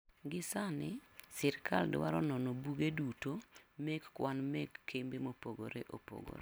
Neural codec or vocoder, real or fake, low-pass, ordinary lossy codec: none; real; none; none